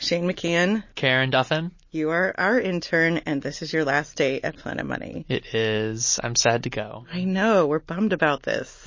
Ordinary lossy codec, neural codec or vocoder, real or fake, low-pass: MP3, 32 kbps; none; real; 7.2 kHz